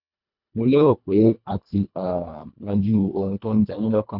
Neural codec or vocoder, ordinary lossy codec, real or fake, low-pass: codec, 24 kHz, 1.5 kbps, HILCodec; none; fake; 5.4 kHz